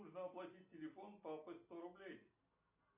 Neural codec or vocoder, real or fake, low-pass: none; real; 3.6 kHz